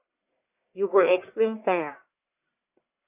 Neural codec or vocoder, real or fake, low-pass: codec, 44.1 kHz, 1.7 kbps, Pupu-Codec; fake; 3.6 kHz